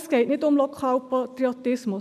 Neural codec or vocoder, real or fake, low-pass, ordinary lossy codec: none; real; 14.4 kHz; none